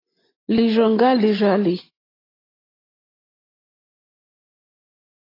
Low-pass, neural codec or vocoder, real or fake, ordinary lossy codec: 5.4 kHz; none; real; AAC, 24 kbps